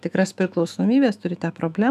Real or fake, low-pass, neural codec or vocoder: fake; 14.4 kHz; autoencoder, 48 kHz, 128 numbers a frame, DAC-VAE, trained on Japanese speech